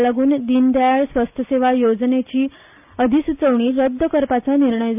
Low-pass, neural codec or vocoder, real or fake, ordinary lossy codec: 3.6 kHz; none; real; none